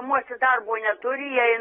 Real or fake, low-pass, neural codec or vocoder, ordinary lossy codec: real; 19.8 kHz; none; AAC, 16 kbps